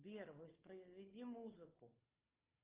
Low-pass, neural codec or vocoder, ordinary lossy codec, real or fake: 3.6 kHz; codec, 16 kHz, 4 kbps, FunCodec, trained on Chinese and English, 50 frames a second; Opus, 24 kbps; fake